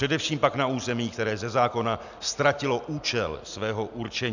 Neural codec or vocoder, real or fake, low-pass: none; real; 7.2 kHz